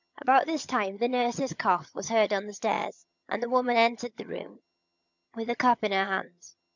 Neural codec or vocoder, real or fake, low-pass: vocoder, 22.05 kHz, 80 mel bands, HiFi-GAN; fake; 7.2 kHz